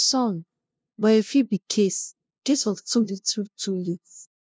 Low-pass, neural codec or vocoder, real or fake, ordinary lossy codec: none; codec, 16 kHz, 0.5 kbps, FunCodec, trained on LibriTTS, 25 frames a second; fake; none